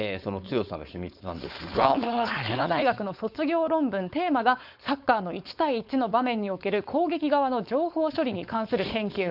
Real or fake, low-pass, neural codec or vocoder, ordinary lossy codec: fake; 5.4 kHz; codec, 16 kHz, 4.8 kbps, FACodec; none